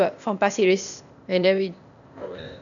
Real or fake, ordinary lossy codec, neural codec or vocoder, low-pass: fake; none; codec, 16 kHz, 0.8 kbps, ZipCodec; 7.2 kHz